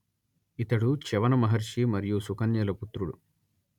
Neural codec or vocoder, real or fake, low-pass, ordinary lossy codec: none; real; 19.8 kHz; none